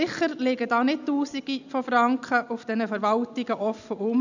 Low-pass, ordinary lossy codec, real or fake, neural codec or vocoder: 7.2 kHz; none; real; none